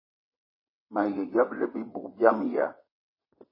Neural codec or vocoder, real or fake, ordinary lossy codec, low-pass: vocoder, 22.05 kHz, 80 mel bands, WaveNeXt; fake; MP3, 24 kbps; 5.4 kHz